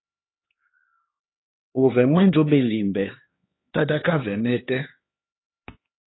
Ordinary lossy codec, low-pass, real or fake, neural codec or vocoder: AAC, 16 kbps; 7.2 kHz; fake; codec, 16 kHz, 2 kbps, X-Codec, HuBERT features, trained on LibriSpeech